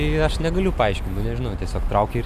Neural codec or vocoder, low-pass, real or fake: none; 14.4 kHz; real